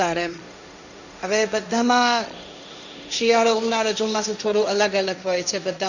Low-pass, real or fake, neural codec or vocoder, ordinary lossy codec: 7.2 kHz; fake; codec, 16 kHz, 1.1 kbps, Voila-Tokenizer; none